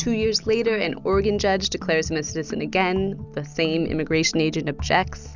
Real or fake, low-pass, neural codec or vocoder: real; 7.2 kHz; none